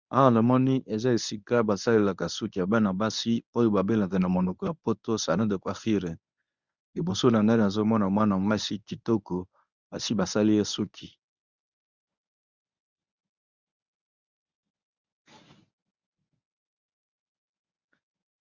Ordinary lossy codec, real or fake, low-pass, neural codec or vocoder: Opus, 64 kbps; fake; 7.2 kHz; codec, 24 kHz, 0.9 kbps, WavTokenizer, medium speech release version 1